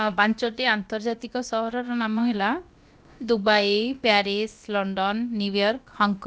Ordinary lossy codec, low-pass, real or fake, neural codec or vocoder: none; none; fake; codec, 16 kHz, about 1 kbps, DyCAST, with the encoder's durations